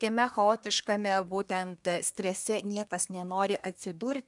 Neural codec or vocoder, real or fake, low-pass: codec, 24 kHz, 1 kbps, SNAC; fake; 10.8 kHz